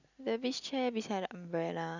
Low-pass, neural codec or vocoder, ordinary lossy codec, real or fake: 7.2 kHz; none; none; real